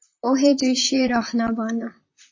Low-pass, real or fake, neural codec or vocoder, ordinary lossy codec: 7.2 kHz; fake; vocoder, 44.1 kHz, 128 mel bands, Pupu-Vocoder; MP3, 32 kbps